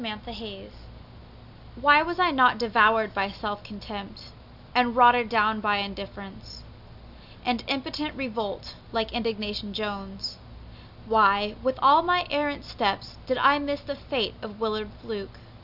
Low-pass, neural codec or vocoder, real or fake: 5.4 kHz; none; real